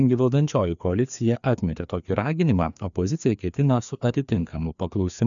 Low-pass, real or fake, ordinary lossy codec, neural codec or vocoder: 7.2 kHz; fake; MP3, 96 kbps; codec, 16 kHz, 2 kbps, FreqCodec, larger model